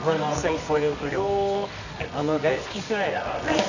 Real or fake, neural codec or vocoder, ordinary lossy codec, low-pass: fake; codec, 24 kHz, 0.9 kbps, WavTokenizer, medium music audio release; none; 7.2 kHz